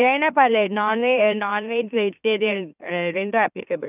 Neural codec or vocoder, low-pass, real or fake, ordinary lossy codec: autoencoder, 44.1 kHz, a latent of 192 numbers a frame, MeloTTS; 3.6 kHz; fake; none